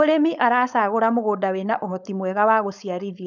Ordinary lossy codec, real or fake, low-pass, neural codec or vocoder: none; fake; 7.2 kHz; codec, 16 kHz, 4.8 kbps, FACodec